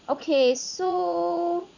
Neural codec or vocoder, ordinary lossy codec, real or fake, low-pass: vocoder, 44.1 kHz, 80 mel bands, Vocos; none; fake; 7.2 kHz